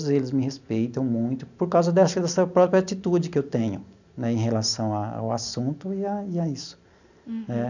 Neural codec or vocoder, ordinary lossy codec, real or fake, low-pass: none; none; real; 7.2 kHz